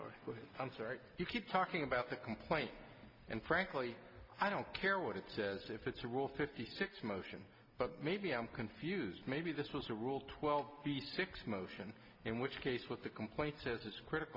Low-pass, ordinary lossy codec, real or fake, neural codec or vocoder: 5.4 kHz; MP3, 48 kbps; real; none